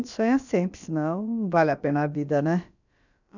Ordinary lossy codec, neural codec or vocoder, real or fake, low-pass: none; codec, 16 kHz, about 1 kbps, DyCAST, with the encoder's durations; fake; 7.2 kHz